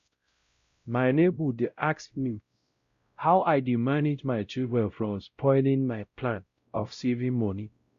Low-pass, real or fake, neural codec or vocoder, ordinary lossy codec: 7.2 kHz; fake; codec, 16 kHz, 0.5 kbps, X-Codec, WavLM features, trained on Multilingual LibriSpeech; Opus, 64 kbps